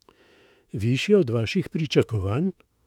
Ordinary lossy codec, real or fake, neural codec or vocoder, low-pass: none; fake; autoencoder, 48 kHz, 32 numbers a frame, DAC-VAE, trained on Japanese speech; 19.8 kHz